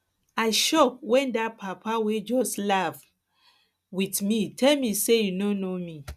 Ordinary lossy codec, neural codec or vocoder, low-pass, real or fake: none; none; 14.4 kHz; real